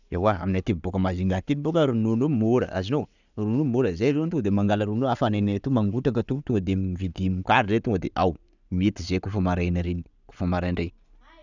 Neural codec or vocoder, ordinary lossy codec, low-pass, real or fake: none; none; 7.2 kHz; real